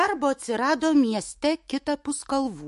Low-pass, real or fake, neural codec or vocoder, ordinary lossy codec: 14.4 kHz; fake; autoencoder, 48 kHz, 128 numbers a frame, DAC-VAE, trained on Japanese speech; MP3, 48 kbps